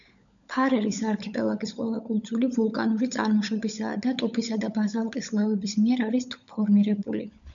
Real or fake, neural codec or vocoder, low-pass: fake; codec, 16 kHz, 16 kbps, FunCodec, trained on LibriTTS, 50 frames a second; 7.2 kHz